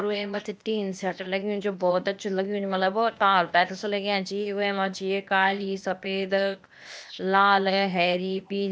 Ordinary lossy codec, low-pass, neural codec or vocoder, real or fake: none; none; codec, 16 kHz, 0.8 kbps, ZipCodec; fake